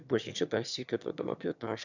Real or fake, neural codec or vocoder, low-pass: fake; autoencoder, 22.05 kHz, a latent of 192 numbers a frame, VITS, trained on one speaker; 7.2 kHz